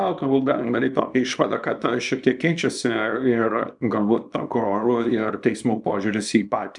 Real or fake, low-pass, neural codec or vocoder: fake; 10.8 kHz; codec, 24 kHz, 0.9 kbps, WavTokenizer, small release